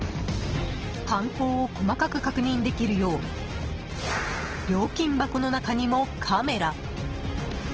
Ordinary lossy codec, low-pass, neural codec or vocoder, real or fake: Opus, 16 kbps; 7.2 kHz; none; real